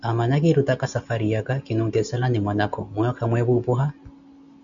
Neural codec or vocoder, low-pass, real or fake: none; 7.2 kHz; real